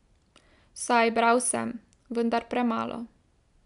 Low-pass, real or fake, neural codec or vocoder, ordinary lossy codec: 10.8 kHz; real; none; MP3, 96 kbps